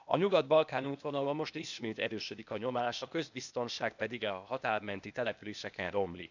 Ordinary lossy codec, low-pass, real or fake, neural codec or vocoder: none; 7.2 kHz; fake; codec, 16 kHz, 0.8 kbps, ZipCodec